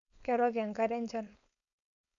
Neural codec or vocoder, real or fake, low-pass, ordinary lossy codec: codec, 16 kHz, 4.8 kbps, FACodec; fake; 7.2 kHz; none